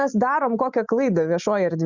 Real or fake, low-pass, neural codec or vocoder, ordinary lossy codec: real; 7.2 kHz; none; Opus, 64 kbps